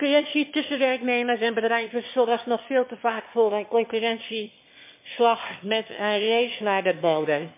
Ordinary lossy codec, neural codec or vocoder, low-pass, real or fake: MP3, 24 kbps; autoencoder, 22.05 kHz, a latent of 192 numbers a frame, VITS, trained on one speaker; 3.6 kHz; fake